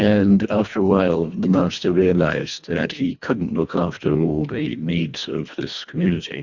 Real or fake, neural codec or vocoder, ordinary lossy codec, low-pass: fake; codec, 24 kHz, 1.5 kbps, HILCodec; Opus, 64 kbps; 7.2 kHz